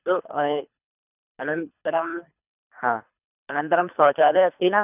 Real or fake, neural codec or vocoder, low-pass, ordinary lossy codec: fake; codec, 24 kHz, 3 kbps, HILCodec; 3.6 kHz; none